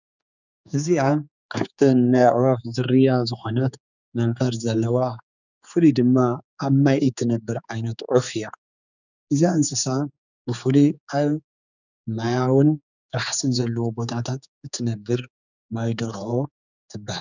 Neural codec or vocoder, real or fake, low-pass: codec, 16 kHz, 4 kbps, X-Codec, HuBERT features, trained on general audio; fake; 7.2 kHz